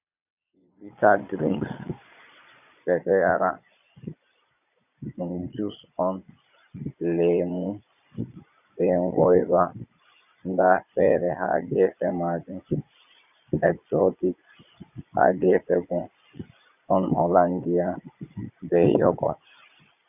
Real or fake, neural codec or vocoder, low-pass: fake; vocoder, 22.05 kHz, 80 mel bands, Vocos; 3.6 kHz